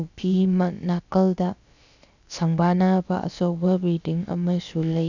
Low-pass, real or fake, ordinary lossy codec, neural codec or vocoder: 7.2 kHz; fake; none; codec, 16 kHz, about 1 kbps, DyCAST, with the encoder's durations